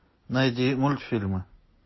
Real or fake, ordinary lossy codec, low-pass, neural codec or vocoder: real; MP3, 24 kbps; 7.2 kHz; none